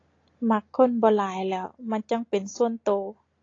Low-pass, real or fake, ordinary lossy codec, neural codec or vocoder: 7.2 kHz; real; AAC, 32 kbps; none